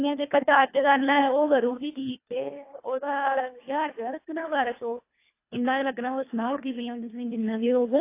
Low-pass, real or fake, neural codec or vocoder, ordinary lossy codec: 3.6 kHz; fake; codec, 24 kHz, 1.5 kbps, HILCodec; AAC, 24 kbps